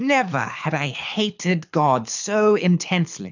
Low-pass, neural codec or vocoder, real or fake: 7.2 kHz; codec, 24 kHz, 6 kbps, HILCodec; fake